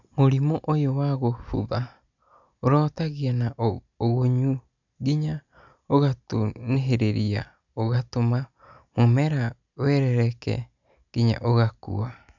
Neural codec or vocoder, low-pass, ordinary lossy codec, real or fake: none; 7.2 kHz; none; real